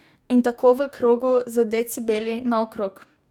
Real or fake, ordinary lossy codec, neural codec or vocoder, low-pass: fake; none; codec, 44.1 kHz, 2.6 kbps, DAC; 19.8 kHz